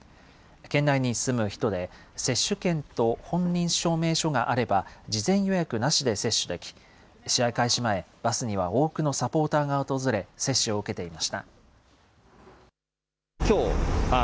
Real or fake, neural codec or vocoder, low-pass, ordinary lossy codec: real; none; none; none